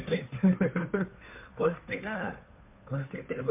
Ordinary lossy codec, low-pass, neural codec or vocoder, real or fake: MP3, 32 kbps; 3.6 kHz; codec, 16 kHz, 2 kbps, FunCodec, trained on Chinese and English, 25 frames a second; fake